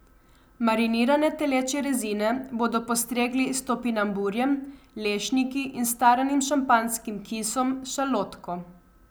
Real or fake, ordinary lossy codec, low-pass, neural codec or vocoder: real; none; none; none